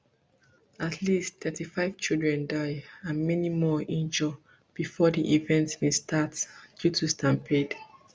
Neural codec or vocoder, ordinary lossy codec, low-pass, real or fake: none; none; none; real